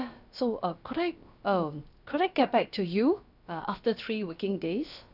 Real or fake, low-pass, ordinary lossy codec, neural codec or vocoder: fake; 5.4 kHz; none; codec, 16 kHz, about 1 kbps, DyCAST, with the encoder's durations